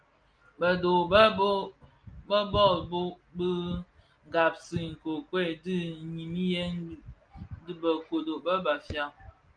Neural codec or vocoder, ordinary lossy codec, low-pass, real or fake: none; Opus, 32 kbps; 9.9 kHz; real